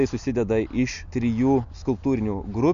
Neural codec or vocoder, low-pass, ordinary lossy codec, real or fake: none; 7.2 kHz; AAC, 96 kbps; real